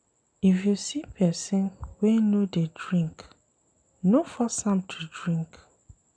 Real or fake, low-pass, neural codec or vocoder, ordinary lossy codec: real; 9.9 kHz; none; none